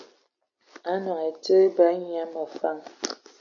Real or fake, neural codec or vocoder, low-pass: real; none; 7.2 kHz